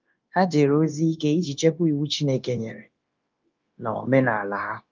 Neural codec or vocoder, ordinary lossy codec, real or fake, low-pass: codec, 16 kHz, 0.9 kbps, LongCat-Audio-Codec; Opus, 24 kbps; fake; 7.2 kHz